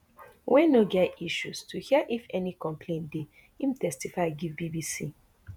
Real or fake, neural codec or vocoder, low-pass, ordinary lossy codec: fake; vocoder, 48 kHz, 128 mel bands, Vocos; none; none